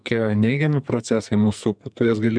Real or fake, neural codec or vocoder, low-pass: fake; codec, 44.1 kHz, 2.6 kbps, SNAC; 9.9 kHz